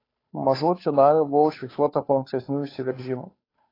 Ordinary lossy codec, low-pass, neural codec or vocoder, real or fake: AAC, 24 kbps; 5.4 kHz; codec, 16 kHz, 2 kbps, FunCodec, trained on Chinese and English, 25 frames a second; fake